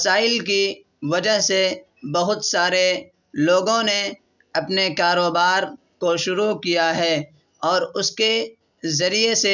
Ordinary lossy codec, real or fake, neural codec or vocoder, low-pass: none; real; none; 7.2 kHz